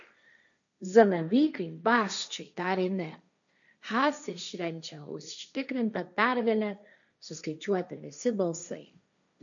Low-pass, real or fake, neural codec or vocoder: 7.2 kHz; fake; codec, 16 kHz, 1.1 kbps, Voila-Tokenizer